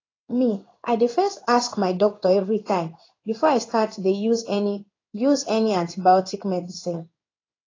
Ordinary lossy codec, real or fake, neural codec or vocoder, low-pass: AAC, 32 kbps; fake; codec, 16 kHz in and 24 kHz out, 1 kbps, XY-Tokenizer; 7.2 kHz